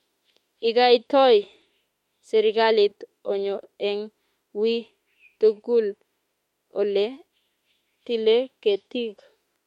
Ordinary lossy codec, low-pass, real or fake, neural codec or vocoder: MP3, 64 kbps; 19.8 kHz; fake; autoencoder, 48 kHz, 32 numbers a frame, DAC-VAE, trained on Japanese speech